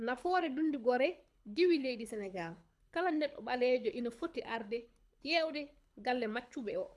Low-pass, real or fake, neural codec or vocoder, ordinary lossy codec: none; fake; codec, 24 kHz, 6 kbps, HILCodec; none